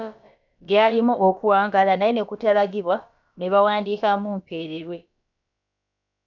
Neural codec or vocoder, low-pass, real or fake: codec, 16 kHz, about 1 kbps, DyCAST, with the encoder's durations; 7.2 kHz; fake